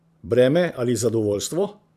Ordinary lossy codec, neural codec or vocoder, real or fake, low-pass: AAC, 96 kbps; vocoder, 44.1 kHz, 128 mel bands every 512 samples, BigVGAN v2; fake; 14.4 kHz